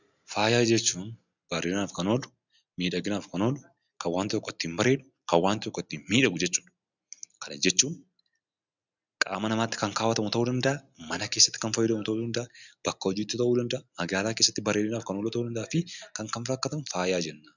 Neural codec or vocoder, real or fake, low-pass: none; real; 7.2 kHz